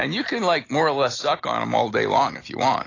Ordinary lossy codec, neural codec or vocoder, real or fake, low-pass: AAC, 32 kbps; none; real; 7.2 kHz